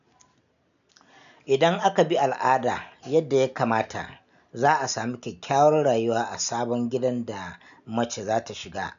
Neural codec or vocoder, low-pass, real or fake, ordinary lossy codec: none; 7.2 kHz; real; none